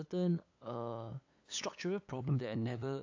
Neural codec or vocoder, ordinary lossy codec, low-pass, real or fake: codec, 16 kHz, 8 kbps, FunCodec, trained on LibriTTS, 25 frames a second; AAC, 48 kbps; 7.2 kHz; fake